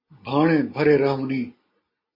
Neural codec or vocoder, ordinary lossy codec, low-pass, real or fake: none; MP3, 24 kbps; 5.4 kHz; real